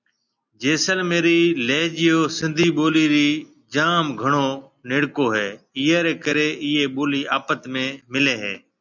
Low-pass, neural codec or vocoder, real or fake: 7.2 kHz; none; real